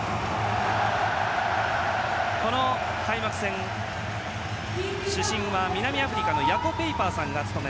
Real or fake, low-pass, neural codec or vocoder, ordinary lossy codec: real; none; none; none